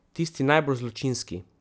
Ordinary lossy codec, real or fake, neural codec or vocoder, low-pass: none; real; none; none